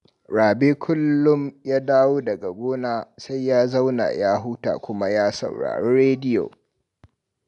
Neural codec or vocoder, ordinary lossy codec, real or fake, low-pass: none; none; real; 10.8 kHz